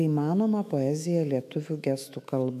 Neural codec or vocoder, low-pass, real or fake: autoencoder, 48 kHz, 128 numbers a frame, DAC-VAE, trained on Japanese speech; 14.4 kHz; fake